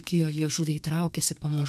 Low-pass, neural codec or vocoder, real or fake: 14.4 kHz; codec, 32 kHz, 1.9 kbps, SNAC; fake